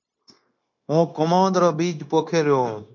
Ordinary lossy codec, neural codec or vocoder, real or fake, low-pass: MP3, 48 kbps; codec, 16 kHz, 0.9 kbps, LongCat-Audio-Codec; fake; 7.2 kHz